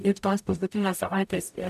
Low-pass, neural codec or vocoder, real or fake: 14.4 kHz; codec, 44.1 kHz, 0.9 kbps, DAC; fake